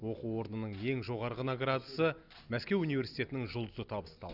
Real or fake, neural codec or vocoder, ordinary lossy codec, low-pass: real; none; Opus, 64 kbps; 5.4 kHz